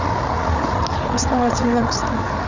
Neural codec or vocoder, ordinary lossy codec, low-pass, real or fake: codec, 16 kHz, 8 kbps, FreqCodec, larger model; none; 7.2 kHz; fake